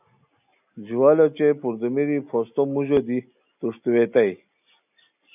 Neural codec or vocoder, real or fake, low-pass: none; real; 3.6 kHz